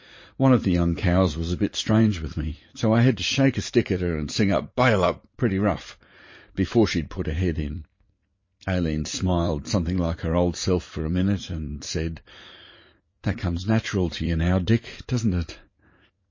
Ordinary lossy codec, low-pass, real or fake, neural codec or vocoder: MP3, 32 kbps; 7.2 kHz; fake; vocoder, 44.1 kHz, 80 mel bands, Vocos